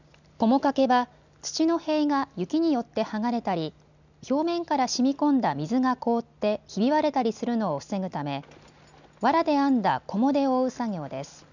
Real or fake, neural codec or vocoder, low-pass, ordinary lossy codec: real; none; 7.2 kHz; none